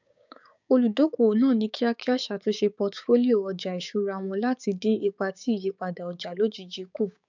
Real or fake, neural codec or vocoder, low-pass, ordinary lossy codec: fake; codec, 16 kHz, 6 kbps, DAC; 7.2 kHz; none